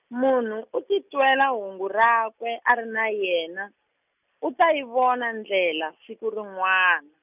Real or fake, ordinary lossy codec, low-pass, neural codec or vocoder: real; none; 3.6 kHz; none